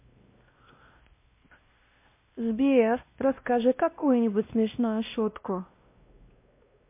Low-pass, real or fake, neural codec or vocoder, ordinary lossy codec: 3.6 kHz; fake; codec, 16 kHz, 1 kbps, X-Codec, WavLM features, trained on Multilingual LibriSpeech; MP3, 24 kbps